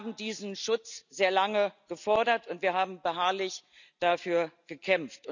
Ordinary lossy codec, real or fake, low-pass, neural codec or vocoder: none; real; 7.2 kHz; none